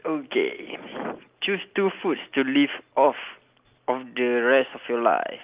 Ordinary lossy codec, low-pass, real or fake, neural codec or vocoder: Opus, 32 kbps; 3.6 kHz; real; none